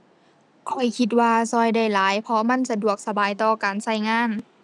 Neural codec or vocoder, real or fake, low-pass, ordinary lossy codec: none; real; none; none